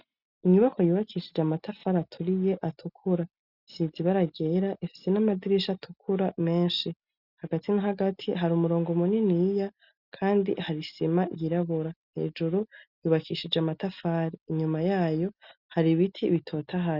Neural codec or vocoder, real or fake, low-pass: none; real; 5.4 kHz